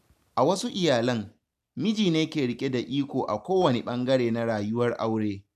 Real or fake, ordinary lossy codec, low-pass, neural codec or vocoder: real; none; 14.4 kHz; none